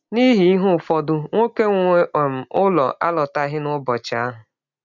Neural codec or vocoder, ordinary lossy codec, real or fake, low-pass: none; none; real; 7.2 kHz